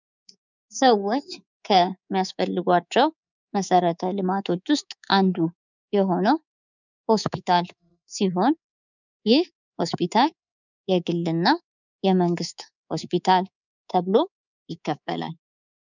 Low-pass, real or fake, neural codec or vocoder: 7.2 kHz; fake; codec, 24 kHz, 3.1 kbps, DualCodec